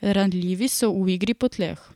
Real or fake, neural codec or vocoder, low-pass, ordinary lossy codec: real; none; 19.8 kHz; none